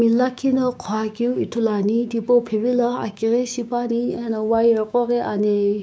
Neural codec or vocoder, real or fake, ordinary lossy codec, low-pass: codec, 16 kHz, 6 kbps, DAC; fake; none; none